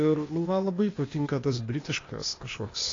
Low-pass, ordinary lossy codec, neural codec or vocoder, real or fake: 7.2 kHz; AAC, 32 kbps; codec, 16 kHz, 0.8 kbps, ZipCodec; fake